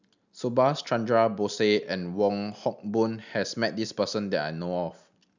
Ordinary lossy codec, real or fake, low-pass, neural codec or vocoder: none; real; 7.2 kHz; none